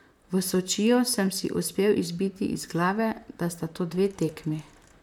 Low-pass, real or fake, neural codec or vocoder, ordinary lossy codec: 19.8 kHz; fake; vocoder, 44.1 kHz, 128 mel bands, Pupu-Vocoder; none